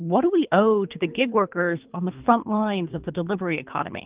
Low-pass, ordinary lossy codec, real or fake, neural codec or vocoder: 3.6 kHz; Opus, 32 kbps; fake; codec, 24 kHz, 3 kbps, HILCodec